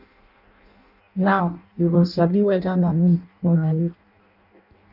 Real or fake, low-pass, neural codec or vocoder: fake; 5.4 kHz; codec, 16 kHz in and 24 kHz out, 0.6 kbps, FireRedTTS-2 codec